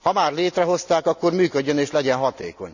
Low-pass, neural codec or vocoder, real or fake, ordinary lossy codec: 7.2 kHz; none; real; none